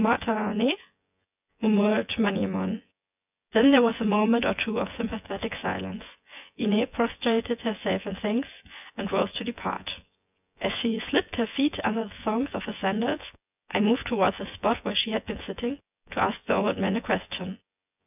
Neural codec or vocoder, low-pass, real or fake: vocoder, 24 kHz, 100 mel bands, Vocos; 3.6 kHz; fake